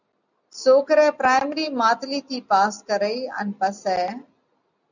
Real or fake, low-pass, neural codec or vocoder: real; 7.2 kHz; none